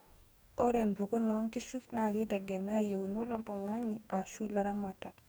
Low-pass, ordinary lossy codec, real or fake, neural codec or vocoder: none; none; fake; codec, 44.1 kHz, 2.6 kbps, DAC